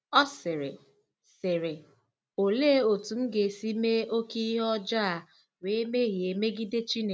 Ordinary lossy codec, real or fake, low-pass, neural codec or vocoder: none; real; none; none